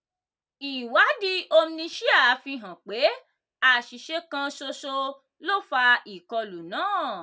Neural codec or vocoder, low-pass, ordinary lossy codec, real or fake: none; none; none; real